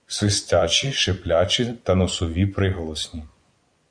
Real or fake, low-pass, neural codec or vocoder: fake; 9.9 kHz; vocoder, 24 kHz, 100 mel bands, Vocos